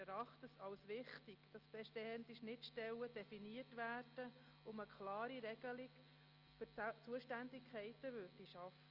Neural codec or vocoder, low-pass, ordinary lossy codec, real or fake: none; 5.4 kHz; Opus, 24 kbps; real